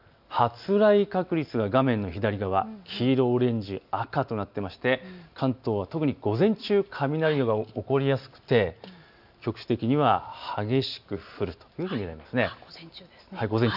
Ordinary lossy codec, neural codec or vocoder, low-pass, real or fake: none; none; 5.4 kHz; real